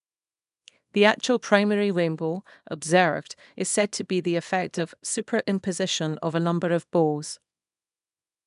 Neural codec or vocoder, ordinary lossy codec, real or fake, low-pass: codec, 24 kHz, 0.9 kbps, WavTokenizer, small release; none; fake; 10.8 kHz